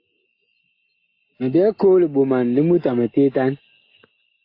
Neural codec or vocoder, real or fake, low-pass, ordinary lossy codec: none; real; 5.4 kHz; AAC, 32 kbps